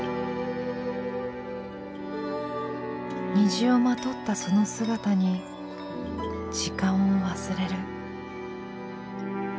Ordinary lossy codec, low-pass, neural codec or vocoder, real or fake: none; none; none; real